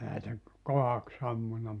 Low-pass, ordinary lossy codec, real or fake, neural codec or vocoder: 10.8 kHz; none; real; none